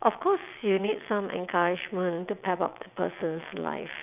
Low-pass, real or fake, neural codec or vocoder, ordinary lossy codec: 3.6 kHz; fake; vocoder, 22.05 kHz, 80 mel bands, WaveNeXt; none